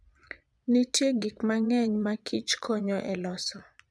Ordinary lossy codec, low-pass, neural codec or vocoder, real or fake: none; none; vocoder, 22.05 kHz, 80 mel bands, Vocos; fake